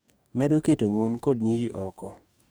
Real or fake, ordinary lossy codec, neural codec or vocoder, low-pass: fake; none; codec, 44.1 kHz, 2.6 kbps, DAC; none